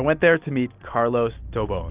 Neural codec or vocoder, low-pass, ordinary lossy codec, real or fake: none; 3.6 kHz; Opus, 24 kbps; real